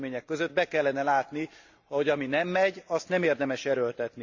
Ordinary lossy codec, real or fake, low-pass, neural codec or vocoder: Opus, 64 kbps; real; 7.2 kHz; none